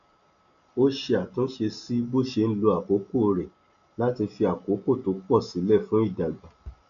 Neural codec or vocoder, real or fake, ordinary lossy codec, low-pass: none; real; none; 7.2 kHz